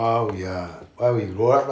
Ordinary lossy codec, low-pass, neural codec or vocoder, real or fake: none; none; none; real